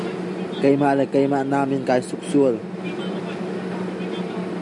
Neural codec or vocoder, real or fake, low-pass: none; real; 10.8 kHz